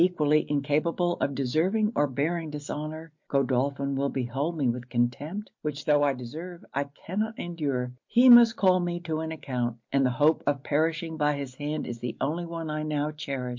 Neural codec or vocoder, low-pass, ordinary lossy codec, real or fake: none; 7.2 kHz; MP3, 48 kbps; real